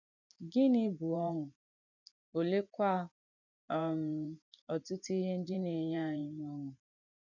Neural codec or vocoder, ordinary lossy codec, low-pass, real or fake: vocoder, 44.1 kHz, 128 mel bands every 512 samples, BigVGAN v2; none; 7.2 kHz; fake